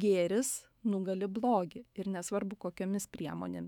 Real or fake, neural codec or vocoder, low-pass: fake; autoencoder, 48 kHz, 128 numbers a frame, DAC-VAE, trained on Japanese speech; 19.8 kHz